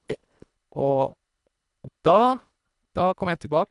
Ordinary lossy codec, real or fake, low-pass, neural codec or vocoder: none; fake; 10.8 kHz; codec, 24 kHz, 1.5 kbps, HILCodec